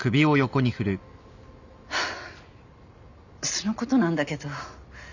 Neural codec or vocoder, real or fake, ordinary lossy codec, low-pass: none; real; none; 7.2 kHz